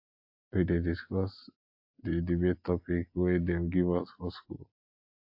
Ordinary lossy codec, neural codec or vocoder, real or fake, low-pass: AAC, 48 kbps; none; real; 5.4 kHz